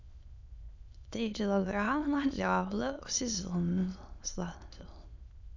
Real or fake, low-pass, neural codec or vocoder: fake; 7.2 kHz; autoencoder, 22.05 kHz, a latent of 192 numbers a frame, VITS, trained on many speakers